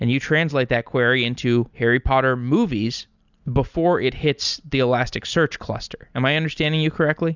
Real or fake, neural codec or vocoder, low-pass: real; none; 7.2 kHz